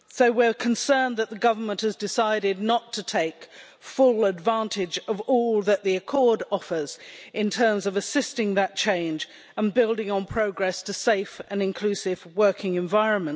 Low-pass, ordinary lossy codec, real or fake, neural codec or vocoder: none; none; real; none